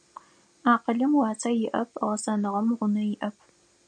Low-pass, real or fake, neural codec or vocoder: 9.9 kHz; real; none